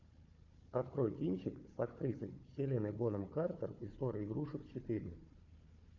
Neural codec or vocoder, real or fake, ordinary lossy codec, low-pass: codec, 16 kHz, 4 kbps, FunCodec, trained on Chinese and English, 50 frames a second; fake; MP3, 48 kbps; 7.2 kHz